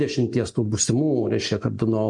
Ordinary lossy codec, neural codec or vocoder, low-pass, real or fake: MP3, 48 kbps; none; 10.8 kHz; real